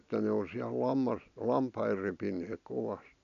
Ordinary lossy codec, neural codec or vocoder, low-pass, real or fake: none; none; 7.2 kHz; real